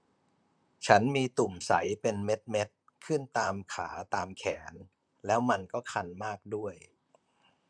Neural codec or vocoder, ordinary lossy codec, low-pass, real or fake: vocoder, 44.1 kHz, 128 mel bands, Pupu-Vocoder; none; 9.9 kHz; fake